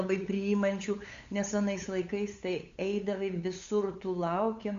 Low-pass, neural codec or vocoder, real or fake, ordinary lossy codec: 7.2 kHz; codec, 16 kHz, 8 kbps, FunCodec, trained on LibriTTS, 25 frames a second; fake; Opus, 64 kbps